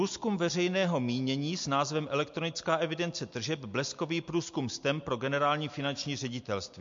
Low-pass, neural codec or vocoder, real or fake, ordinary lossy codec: 7.2 kHz; none; real; MP3, 48 kbps